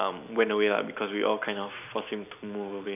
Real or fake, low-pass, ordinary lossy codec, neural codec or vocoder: fake; 3.6 kHz; none; vocoder, 44.1 kHz, 128 mel bands every 256 samples, BigVGAN v2